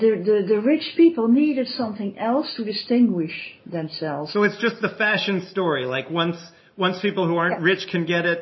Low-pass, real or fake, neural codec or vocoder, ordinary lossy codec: 7.2 kHz; real; none; MP3, 24 kbps